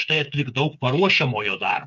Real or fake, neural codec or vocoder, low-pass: fake; codec, 16 kHz, 8 kbps, FreqCodec, smaller model; 7.2 kHz